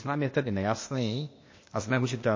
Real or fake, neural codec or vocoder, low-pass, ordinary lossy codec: fake; codec, 16 kHz, 0.8 kbps, ZipCodec; 7.2 kHz; MP3, 32 kbps